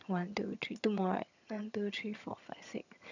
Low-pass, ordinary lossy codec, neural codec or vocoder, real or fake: 7.2 kHz; none; vocoder, 22.05 kHz, 80 mel bands, HiFi-GAN; fake